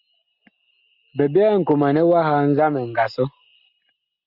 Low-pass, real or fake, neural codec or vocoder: 5.4 kHz; real; none